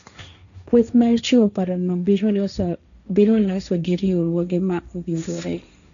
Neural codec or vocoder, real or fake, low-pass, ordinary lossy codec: codec, 16 kHz, 1.1 kbps, Voila-Tokenizer; fake; 7.2 kHz; none